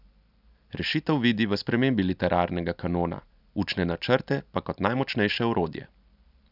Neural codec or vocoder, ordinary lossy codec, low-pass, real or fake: none; none; 5.4 kHz; real